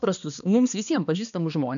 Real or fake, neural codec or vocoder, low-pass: fake; codec, 16 kHz, 2 kbps, X-Codec, HuBERT features, trained on balanced general audio; 7.2 kHz